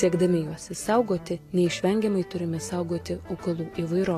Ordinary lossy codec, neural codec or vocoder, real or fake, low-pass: AAC, 48 kbps; none; real; 14.4 kHz